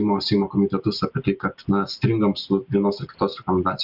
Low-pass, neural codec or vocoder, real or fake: 5.4 kHz; none; real